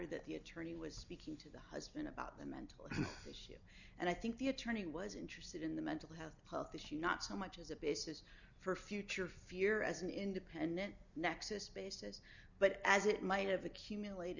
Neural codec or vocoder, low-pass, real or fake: none; 7.2 kHz; real